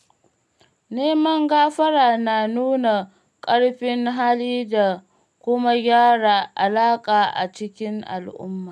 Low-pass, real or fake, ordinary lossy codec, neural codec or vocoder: none; real; none; none